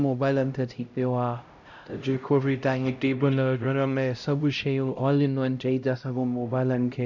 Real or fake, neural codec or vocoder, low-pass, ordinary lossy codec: fake; codec, 16 kHz, 0.5 kbps, X-Codec, HuBERT features, trained on LibriSpeech; 7.2 kHz; none